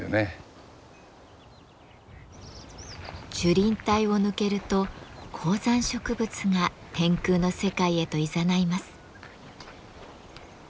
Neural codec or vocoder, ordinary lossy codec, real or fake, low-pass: none; none; real; none